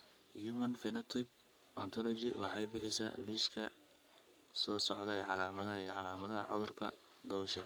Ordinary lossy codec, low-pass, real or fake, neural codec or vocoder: none; none; fake; codec, 44.1 kHz, 3.4 kbps, Pupu-Codec